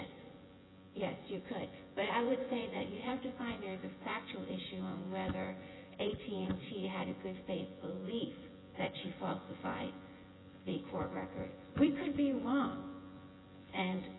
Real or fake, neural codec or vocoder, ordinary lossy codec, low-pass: fake; vocoder, 24 kHz, 100 mel bands, Vocos; AAC, 16 kbps; 7.2 kHz